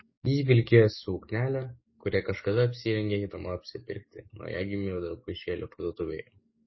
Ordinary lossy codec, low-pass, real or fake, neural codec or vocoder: MP3, 24 kbps; 7.2 kHz; fake; codec, 44.1 kHz, 7.8 kbps, DAC